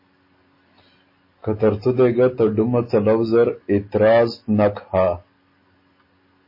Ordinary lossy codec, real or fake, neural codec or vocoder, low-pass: MP3, 24 kbps; real; none; 5.4 kHz